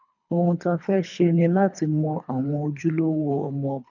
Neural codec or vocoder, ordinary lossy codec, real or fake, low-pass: codec, 24 kHz, 3 kbps, HILCodec; none; fake; 7.2 kHz